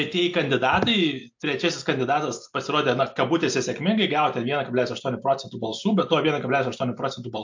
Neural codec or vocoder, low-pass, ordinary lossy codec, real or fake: none; 7.2 kHz; MP3, 48 kbps; real